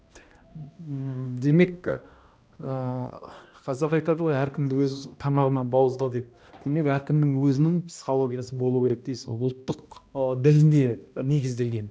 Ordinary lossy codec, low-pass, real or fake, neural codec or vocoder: none; none; fake; codec, 16 kHz, 1 kbps, X-Codec, HuBERT features, trained on balanced general audio